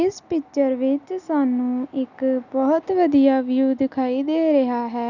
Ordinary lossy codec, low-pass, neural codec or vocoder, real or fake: none; 7.2 kHz; none; real